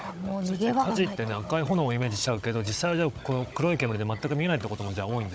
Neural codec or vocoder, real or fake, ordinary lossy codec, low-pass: codec, 16 kHz, 16 kbps, FunCodec, trained on Chinese and English, 50 frames a second; fake; none; none